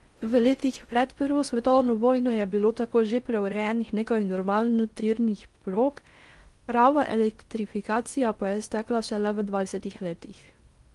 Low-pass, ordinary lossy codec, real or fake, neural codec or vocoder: 10.8 kHz; Opus, 32 kbps; fake; codec, 16 kHz in and 24 kHz out, 0.6 kbps, FocalCodec, streaming, 4096 codes